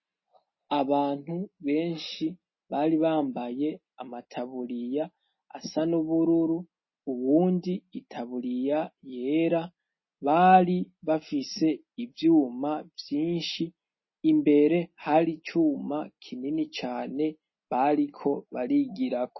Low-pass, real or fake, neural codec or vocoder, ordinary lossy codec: 7.2 kHz; real; none; MP3, 24 kbps